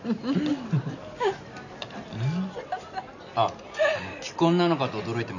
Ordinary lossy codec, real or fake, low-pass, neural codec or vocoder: none; real; 7.2 kHz; none